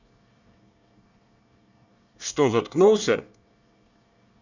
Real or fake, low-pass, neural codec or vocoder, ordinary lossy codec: fake; 7.2 kHz; codec, 24 kHz, 1 kbps, SNAC; none